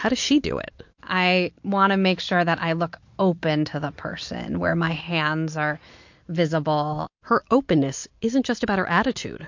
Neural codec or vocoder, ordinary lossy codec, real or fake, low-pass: none; MP3, 48 kbps; real; 7.2 kHz